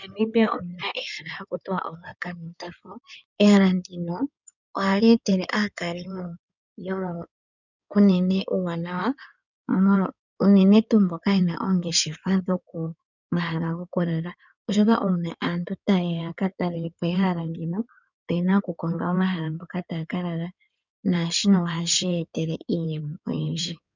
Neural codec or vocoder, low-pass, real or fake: codec, 16 kHz in and 24 kHz out, 2.2 kbps, FireRedTTS-2 codec; 7.2 kHz; fake